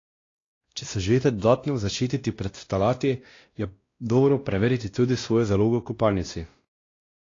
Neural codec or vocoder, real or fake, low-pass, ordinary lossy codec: codec, 16 kHz, 1 kbps, X-Codec, WavLM features, trained on Multilingual LibriSpeech; fake; 7.2 kHz; AAC, 32 kbps